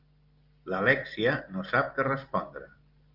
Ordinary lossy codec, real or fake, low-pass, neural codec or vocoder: Opus, 24 kbps; real; 5.4 kHz; none